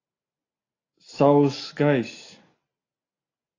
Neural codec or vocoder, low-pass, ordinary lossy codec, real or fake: none; 7.2 kHz; AAC, 32 kbps; real